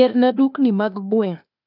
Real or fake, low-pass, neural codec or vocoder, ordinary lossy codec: fake; 5.4 kHz; codec, 16 kHz, 0.8 kbps, ZipCodec; none